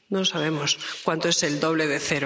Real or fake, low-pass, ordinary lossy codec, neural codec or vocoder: real; none; none; none